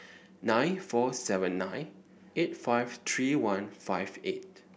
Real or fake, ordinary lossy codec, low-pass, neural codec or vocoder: real; none; none; none